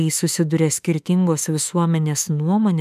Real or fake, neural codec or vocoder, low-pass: fake; autoencoder, 48 kHz, 32 numbers a frame, DAC-VAE, trained on Japanese speech; 14.4 kHz